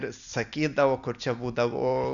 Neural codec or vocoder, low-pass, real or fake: none; 7.2 kHz; real